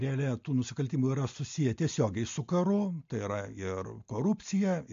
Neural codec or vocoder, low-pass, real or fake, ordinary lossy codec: none; 7.2 kHz; real; MP3, 48 kbps